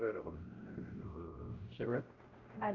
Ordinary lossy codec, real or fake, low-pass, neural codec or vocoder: Opus, 24 kbps; fake; 7.2 kHz; codec, 16 kHz, 0.5 kbps, X-Codec, WavLM features, trained on Multilingual LibriSpeech